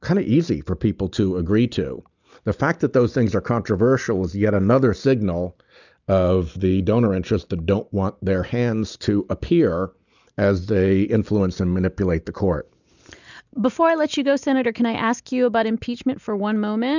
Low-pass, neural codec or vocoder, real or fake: 7.2 kHz; none; real